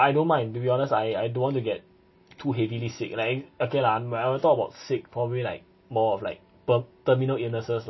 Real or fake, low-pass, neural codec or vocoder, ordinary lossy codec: real; 7.2 kHz; none; MP3, 24 kbps